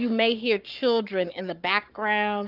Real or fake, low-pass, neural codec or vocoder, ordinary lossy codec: fake; 5.4 kHz; codec, 44.1 kHz, 7.8 kbps, Pupu-Codec; Opus, 24 kbps